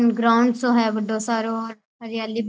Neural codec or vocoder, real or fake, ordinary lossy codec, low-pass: none; real; none; none